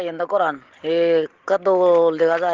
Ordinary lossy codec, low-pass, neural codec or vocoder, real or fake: Opus, 16 kbps; 7.2 kHz; none; real